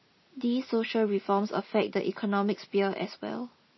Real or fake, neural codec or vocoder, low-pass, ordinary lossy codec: real; none; 7.2 kHz; MP3, 24 kbps